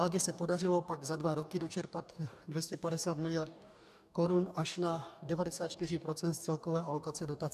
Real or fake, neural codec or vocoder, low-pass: fake; codec, 44.1 kHz, 2.6 kbps, DAC; 14.4 kHz